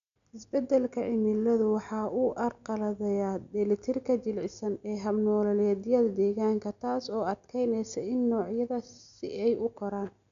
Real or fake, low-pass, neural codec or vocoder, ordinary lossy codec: real; 7.2 kHz; none; none